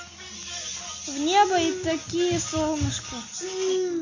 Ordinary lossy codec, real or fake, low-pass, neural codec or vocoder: Opus, 64 kbps; real; 7.2 kHz; none